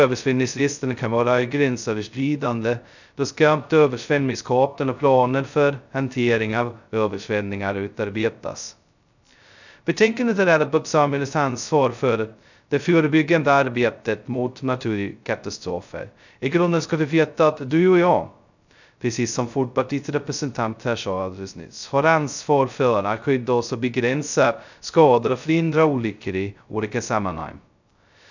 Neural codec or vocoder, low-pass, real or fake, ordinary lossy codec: codec, 16 kHz, 0.2 kbps, FocalCodec; 7.2 kHz; fake; none